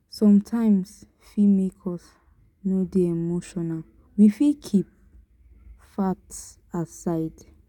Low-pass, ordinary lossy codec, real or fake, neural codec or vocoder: 19.8 kHz; none; real; none